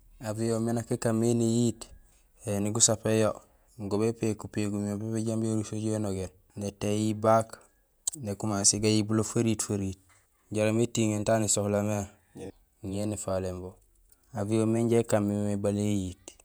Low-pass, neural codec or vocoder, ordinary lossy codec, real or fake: none; vocoder, 48 kHz, 128 mel bands, Vocos; none; fake